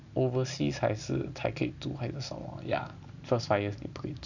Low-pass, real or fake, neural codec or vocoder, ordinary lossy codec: 7.2 kHz; real; none; none